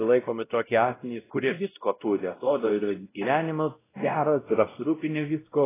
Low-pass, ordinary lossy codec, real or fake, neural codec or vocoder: 3.6 kHz; AAC, 16 kbps; fake; codec, 16 kHz, 0.5 kbps, X-Codec, WavLM features, trained on Multilingual LibriSpeech